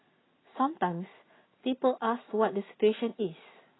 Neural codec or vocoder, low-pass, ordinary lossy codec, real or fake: none; 7.2 kHz; AAC, 16 kbps; real